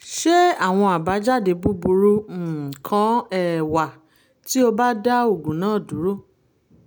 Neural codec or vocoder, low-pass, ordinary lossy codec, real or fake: none; none; none; real